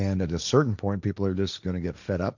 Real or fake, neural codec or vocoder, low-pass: fake; codec, 16 kHz, 1.1 kbps, Voila-Tokenizer; 7.2 kHz